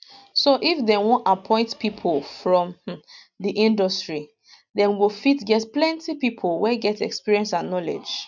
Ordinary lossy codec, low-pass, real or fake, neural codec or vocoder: none; 7.2 kHz; real; none